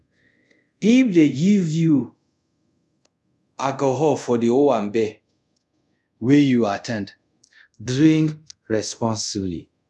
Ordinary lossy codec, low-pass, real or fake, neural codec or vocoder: none; none; fake; codec, 24 kHz, 0.5 kbps, DualCodec